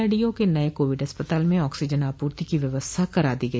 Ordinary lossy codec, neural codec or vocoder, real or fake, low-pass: none; none; real; none